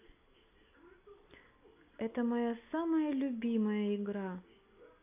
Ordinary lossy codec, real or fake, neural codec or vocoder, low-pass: AAC, 32 kbps; real; none; 3.6 kHz